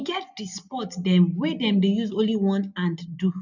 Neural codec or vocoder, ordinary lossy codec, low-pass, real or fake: none; none; 7.2 kHz; real